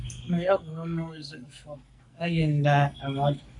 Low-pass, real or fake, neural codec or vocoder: 10.8 kHz; fake; codec, 44.1 kHz, 2.6 kbps, SNAC